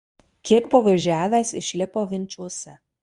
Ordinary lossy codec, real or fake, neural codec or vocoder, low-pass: Opus, 64 kbps; fake; codec, 24 kHz, 0.9 kbps, WavTokenizer, medium speech release version 1; 10.8 kHz